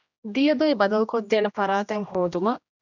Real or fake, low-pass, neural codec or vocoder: fake; 7.2 kHz; codec, 16 kHz, 1 kbps, X-Codec, HuBERT features, trained on general audio